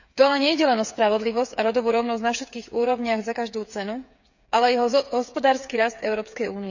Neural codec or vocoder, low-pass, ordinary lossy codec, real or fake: codec, 16 kHz, 8 kbps, FreqCodec, smaller model; 7.2 kHz; none; fake